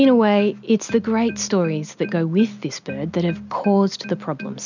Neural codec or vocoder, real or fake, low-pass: none; real; 7.2 kHz